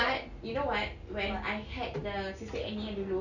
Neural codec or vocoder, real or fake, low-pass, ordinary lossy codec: none; real; 7.2 kHz; none